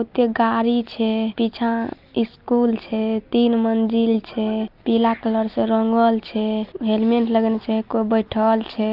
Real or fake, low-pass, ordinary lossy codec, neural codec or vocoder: real; 5.4 kHz; Opus, 32 kbps; none